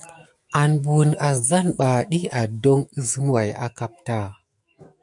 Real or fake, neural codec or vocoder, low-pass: fake; autoencoder, 48 kHz, 128 numbers a frame, DAC-VAE, trained on Japanese speech; 10.8 kHz